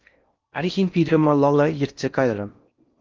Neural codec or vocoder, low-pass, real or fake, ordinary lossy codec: codec, 16 kHz in and 24 kHz out, 0.6 kbps, FocalCodec, streaming, 4096 codes; 7.2 kHz; fake; Opus, 32 kbps